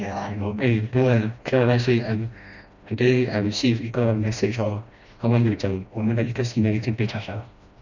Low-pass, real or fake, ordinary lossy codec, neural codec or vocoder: 7.2 kHz; fake; none; codec, 16 kHz, 1 kbps, FreqCodec, smaller model